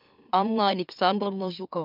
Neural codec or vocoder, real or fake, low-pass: autoencoder, 44.1 kHz, a latent of 192 numbers a frame, MeloTTS; fake; 5.4 kHz